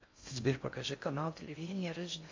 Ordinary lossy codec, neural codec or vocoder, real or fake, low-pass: MP3, 48 kbps; codec, 16 kHz in and 24 kHz out, 0.6 kbps, FocalCodec, streaming, 4096 codes; fake; 7.2 kHz